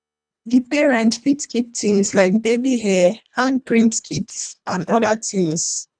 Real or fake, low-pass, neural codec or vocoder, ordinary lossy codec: fake; 9.9 kHz; codec, 24 kHz, 1.5 kbps, HILCodec; none